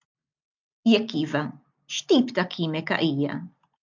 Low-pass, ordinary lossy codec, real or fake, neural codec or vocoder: 7.2 kHz; MP3, 64 kbps; real; none